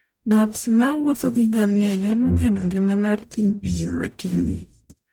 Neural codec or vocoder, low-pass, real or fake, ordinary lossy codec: codec, 44.1 kHz, 0.9 kbps, DAC; none; fake; none